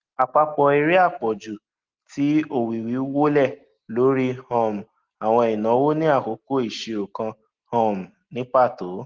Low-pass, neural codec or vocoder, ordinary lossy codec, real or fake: 7.2 kHz; none; Opus, 16 kbps; real